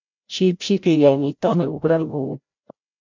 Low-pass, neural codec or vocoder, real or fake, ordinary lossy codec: 7.2 kHz; codec, 16 kHz, 0.5 kbps, FreqCodec, larger model; fake; MP3, 64 kbps